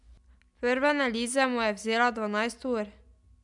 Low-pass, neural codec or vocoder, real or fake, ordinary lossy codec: 10.8 kHz; none; real; none